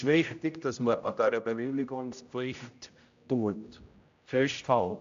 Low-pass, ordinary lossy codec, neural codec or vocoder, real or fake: 7.2 kHz; AAC, 64 kbps; codec, 16 kHz, 0.5 kbps, X-Codec, HuBERT features, trained on general audio; fake